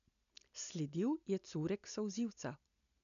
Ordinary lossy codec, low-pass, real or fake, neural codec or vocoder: none; 7.2 kHz; real; none